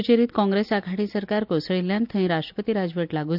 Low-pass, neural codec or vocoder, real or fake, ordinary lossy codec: 5.4 kHz; none; real; none